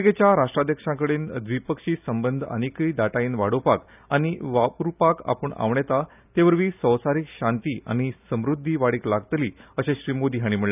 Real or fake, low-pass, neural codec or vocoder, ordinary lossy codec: real; 3.6 kHz; none; none